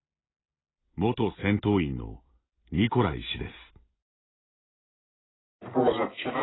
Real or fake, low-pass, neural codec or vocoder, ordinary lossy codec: real; 7.2 kHz; none; AAC, 16 kbps